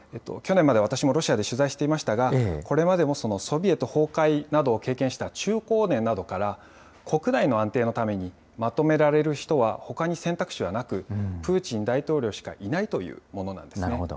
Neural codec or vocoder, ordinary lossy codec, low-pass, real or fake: none; none; none; real